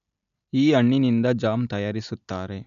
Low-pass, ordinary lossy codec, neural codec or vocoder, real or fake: 7.2 kHz; none; none; real